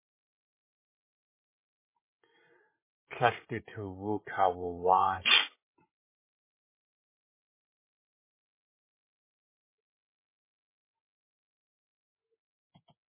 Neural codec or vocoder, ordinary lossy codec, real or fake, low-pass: codec, 16 kHz, 8 kbps, FreqCodec, larger model; MP3, 16 kbps; fake; 3.6 kHz